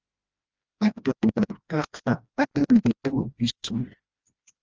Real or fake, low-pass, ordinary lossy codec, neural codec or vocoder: fake; 7.2 kHz; Opus, 24 kbps; codec, 16 kHz, 1 kbps, FreqCodec, smaller model